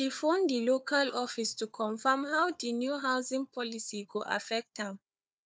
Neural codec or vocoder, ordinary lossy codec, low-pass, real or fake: codec, 16 kHz, 4 kbps, FunCodec, trained on Chinese and English, 50 frames a second; none; none; fake